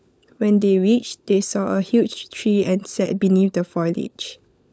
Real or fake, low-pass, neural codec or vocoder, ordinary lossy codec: fake; none; codec, 16 kHz, 16 kbps, FunCodec, trained on LibriTTS, 50 frames a second; none